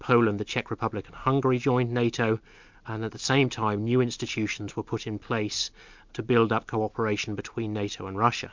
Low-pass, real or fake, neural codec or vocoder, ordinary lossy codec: 7.2 kHz; real; none; MP3, 64 kbps